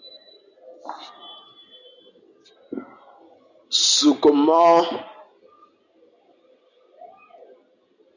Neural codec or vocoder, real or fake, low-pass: none; real; 7.2 kHz